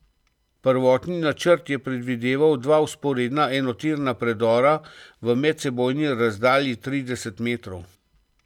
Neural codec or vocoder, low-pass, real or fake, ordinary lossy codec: none; 19.8 kHz; real; none